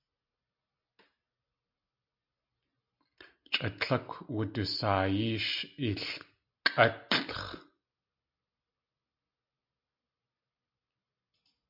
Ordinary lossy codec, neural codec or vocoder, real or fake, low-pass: MP3, 48 kbps; none; real; 5.4 kHz